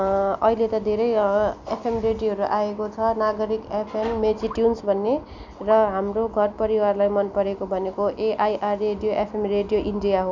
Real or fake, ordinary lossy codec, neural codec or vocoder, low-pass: real; none; none; 7.2 kHz